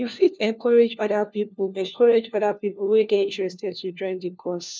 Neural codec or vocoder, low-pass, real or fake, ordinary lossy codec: codec, 16 kHz, 1 kbps, FunCodec, trained on LibriTTS, 50 frames a second; none; fake; none